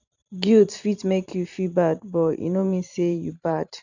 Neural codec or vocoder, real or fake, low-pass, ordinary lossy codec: vocoder, 44.1 kHz, 128 mel bands every 512 samples, BigVGAN v2; fake; 7.2 kHz; none